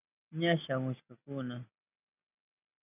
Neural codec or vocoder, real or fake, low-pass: none; real; 3.6 kHz